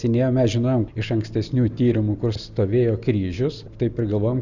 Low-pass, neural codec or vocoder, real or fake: 7.2 kHz; none; real